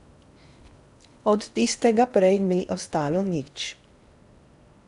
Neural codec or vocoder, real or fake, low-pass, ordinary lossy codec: codec, 16 kHz in and 24 kHz out, 0.8 kbps, FocalCodec, streaming, 65536 codes; fake; 10.8 kHz; none